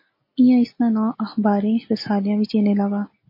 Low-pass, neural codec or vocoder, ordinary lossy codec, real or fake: 5.4 kHz; none; MP3, 24 kbps; real